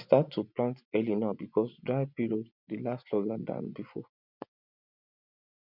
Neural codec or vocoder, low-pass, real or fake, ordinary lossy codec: vocoder, 24 kHz, 100 mel bands, Vocos; 5.4 kHz; fake; none